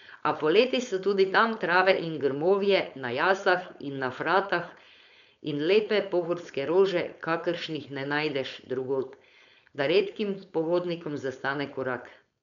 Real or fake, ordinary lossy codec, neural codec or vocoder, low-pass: fake; none; codec, 16 kHz, 4.8 kbps, FACodec; 7.2 kHz